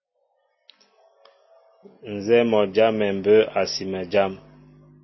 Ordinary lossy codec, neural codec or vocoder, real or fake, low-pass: MP3, 24 kbps; none; real; 7.2 kHz